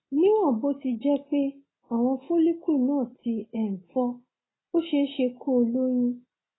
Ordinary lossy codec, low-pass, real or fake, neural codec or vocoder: AAC, 16 kbps; 7.2 kHz; real; none